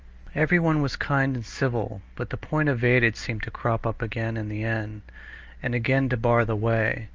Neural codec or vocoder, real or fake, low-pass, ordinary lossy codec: none; real; 7.2 kHz; Opus, 24 kbps